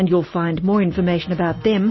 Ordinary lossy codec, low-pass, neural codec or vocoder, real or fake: MP3, 24 kbps; 7.2 kHz; none; real